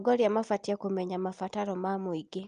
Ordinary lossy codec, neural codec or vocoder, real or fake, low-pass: Opus, 32 kbps; none; real; 9.9 kHz